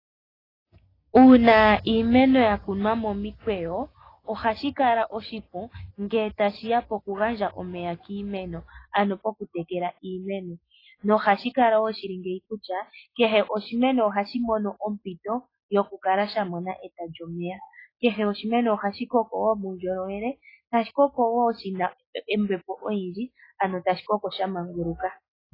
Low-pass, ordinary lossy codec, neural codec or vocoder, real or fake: 5.4 kHz; AAC, 24 kbps; none; real